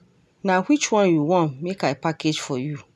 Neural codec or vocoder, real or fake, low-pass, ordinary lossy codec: none; real; none; none